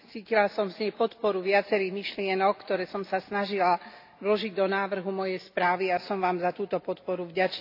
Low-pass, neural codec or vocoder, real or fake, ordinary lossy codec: 5.4 kHz; none; real; AAC, 32 kbps